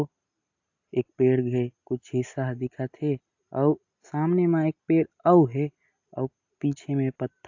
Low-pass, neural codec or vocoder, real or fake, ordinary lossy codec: 7.2 kHz; none; real; AAC, 48 kbps